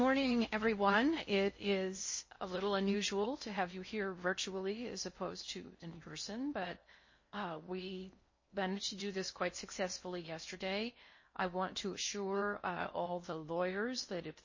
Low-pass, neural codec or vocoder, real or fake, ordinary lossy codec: 7.2 kHz; codec, 16 kHz in and 24 kHz out, 0.6 kbps, FocalCodec, streaming, 2048 codes; fake; MP3, 32 kbps